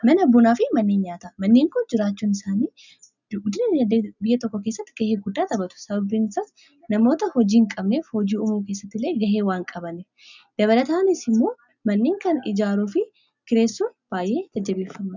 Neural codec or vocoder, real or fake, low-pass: none; real; 7.2 kHz